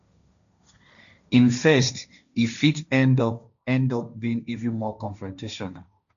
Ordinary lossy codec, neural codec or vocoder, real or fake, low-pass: none; codec, 16 kHz, 1.1 kbps, Voila-Tokenizer; fake; 7.2 kHz